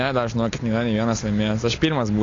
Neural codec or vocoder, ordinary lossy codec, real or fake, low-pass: none; AAC, 32 kbps; real; 7.2 kHz